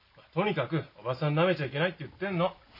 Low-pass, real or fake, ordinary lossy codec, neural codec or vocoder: 5.4 kHz; real; none; none